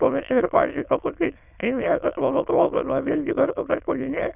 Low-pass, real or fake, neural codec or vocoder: 3.6 kHz; fake; autoencoder, 22.05 kHz, a latent of 192 numbers a frame, VITS, trained on many speakers